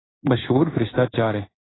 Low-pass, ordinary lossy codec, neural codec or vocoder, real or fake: 7.2 kHz; AAC, 16 kbps; codec, 16 kHz in and 24 kHz out, 1 kbps, XY-Tokenizer; fake